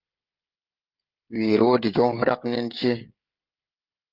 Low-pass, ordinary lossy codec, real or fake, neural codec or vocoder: 5.4 kHz; Opus, 32 kbps; fake; codec, 16 kHz, 16 kbps, FreqCodec, smaller model